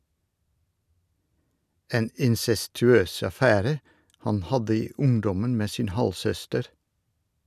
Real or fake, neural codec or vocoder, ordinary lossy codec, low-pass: real; none; none; 14.4 kHz